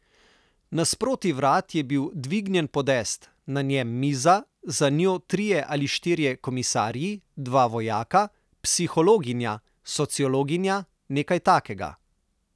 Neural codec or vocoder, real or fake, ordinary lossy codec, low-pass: none; real; none; none